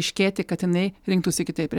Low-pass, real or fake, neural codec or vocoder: 19.8 kHz; real; none